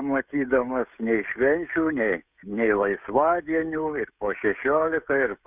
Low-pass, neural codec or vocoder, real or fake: 3.6 kHz; codec, 16 kHz, 16 kbps, FreqCodec, smaller model; fake